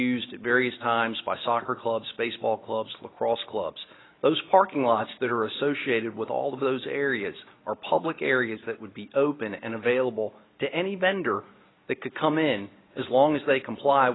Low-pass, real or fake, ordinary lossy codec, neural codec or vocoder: 7.2 kHz; real; AAC, 16 kbps; none